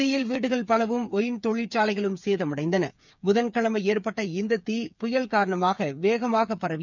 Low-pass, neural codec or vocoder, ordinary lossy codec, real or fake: 7.2 kHz; codec, 16 kHz, 8 kbps, FreqCodec, smaller model; none; fake